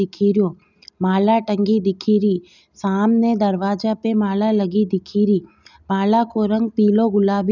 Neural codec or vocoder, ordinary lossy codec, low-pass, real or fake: none; none; 7.2 kHz; real